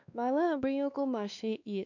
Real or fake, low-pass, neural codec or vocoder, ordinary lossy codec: fake; 7.2 kHz; codec, 16 kHz, 2 kbps, X-Codec, WavLM features, trained on Multilingual LibriSpeech; none